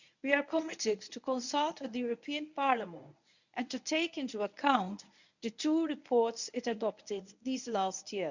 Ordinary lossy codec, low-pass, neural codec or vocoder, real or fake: none; 7.2 kHz; codec, 24 kHz, 0.9 kbps, WavTokenizer, medium speech release version 1; fake